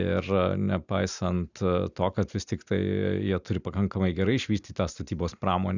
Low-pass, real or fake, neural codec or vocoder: 7.2 kHz; real; none